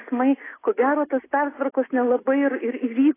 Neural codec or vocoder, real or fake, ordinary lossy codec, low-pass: none; real; AAC, 16 kbps; 3.6 kHz